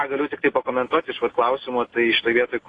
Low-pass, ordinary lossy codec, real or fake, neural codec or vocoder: 10.8 kHz; AAC, 32 kbps; real; none